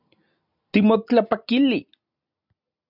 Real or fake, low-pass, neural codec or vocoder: real; 5.4 kHz; none